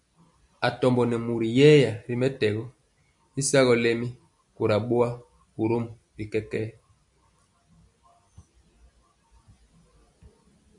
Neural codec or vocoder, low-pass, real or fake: none; 10.8 kHz; real